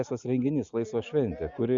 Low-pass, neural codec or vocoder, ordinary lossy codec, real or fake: 7.2 kHz; none; Opus, 64 kbps; real